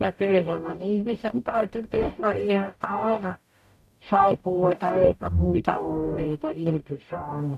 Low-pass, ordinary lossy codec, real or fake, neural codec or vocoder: 14.4 kHz; none; fake; codec, 44.1 kHz, 0.9 kbps, DAC